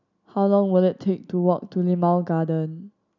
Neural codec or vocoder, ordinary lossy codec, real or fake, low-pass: none; none; real; 7.2 kHz